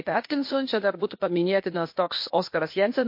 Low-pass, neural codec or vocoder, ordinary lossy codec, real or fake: 5.4 kHz; codec, 16 kHz, 0.8 kbps, ZipCodec; MP3, 32 kbps; fake